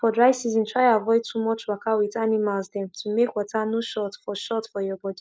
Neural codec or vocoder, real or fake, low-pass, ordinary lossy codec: none; real; none; none